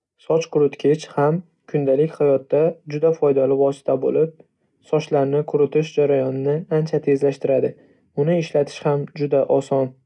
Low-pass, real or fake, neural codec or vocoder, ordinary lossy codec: 10.8 kHz; real; none; none